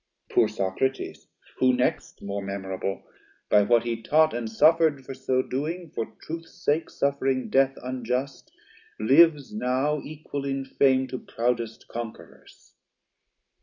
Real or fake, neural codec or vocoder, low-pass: real; none; 7.2 kHz